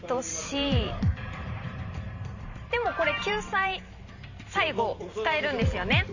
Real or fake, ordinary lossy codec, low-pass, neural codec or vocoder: fake; none; 7.2 kHz; vocoder, 44.1 kHz, 128 mel bands every 512 samples, BigVGAN v2